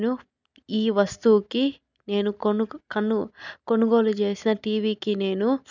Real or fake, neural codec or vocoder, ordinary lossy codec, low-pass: real; none; none; 7.2 kHz